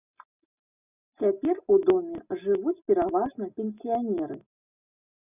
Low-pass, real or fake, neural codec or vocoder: 3.6 kHz; real; none